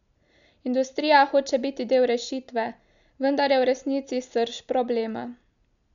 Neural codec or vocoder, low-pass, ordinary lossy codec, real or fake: none; 7.2 kHz; none; real